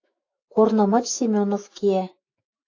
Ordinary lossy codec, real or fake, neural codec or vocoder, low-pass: AAC, 48 kbps; fake; autoencoder, 48 kHz, 128 numbers a frame, DAC-VAE, trained on Japanese speech; 7.2 kHz